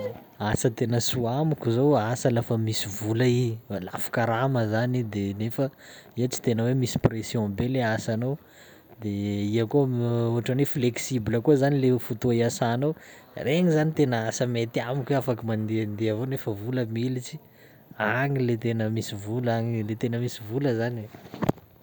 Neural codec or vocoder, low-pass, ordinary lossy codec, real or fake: none; none; none; real